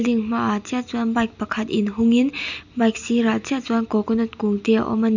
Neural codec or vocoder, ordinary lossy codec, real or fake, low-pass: none; none; real; 7.2 kHz